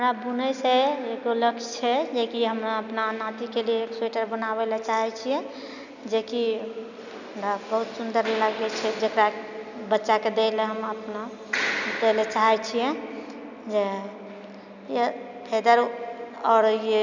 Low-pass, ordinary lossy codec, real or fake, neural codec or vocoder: 7.2 kHz; none; real; none